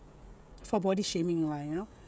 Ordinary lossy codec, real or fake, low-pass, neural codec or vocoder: none; fake; none; codec, 16 kHz, 16 kbps, FreqCodec, smaller model